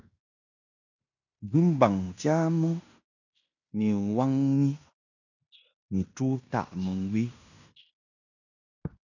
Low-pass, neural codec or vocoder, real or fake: 7.2 kHz; codec, 16 kHz in and 24 kHz out, 0.9 kbps, LongCat-Audio-Codec, fine tuned four codebook decoder; fake